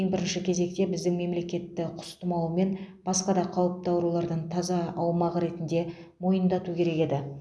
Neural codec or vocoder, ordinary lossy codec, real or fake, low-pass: none; none; real; none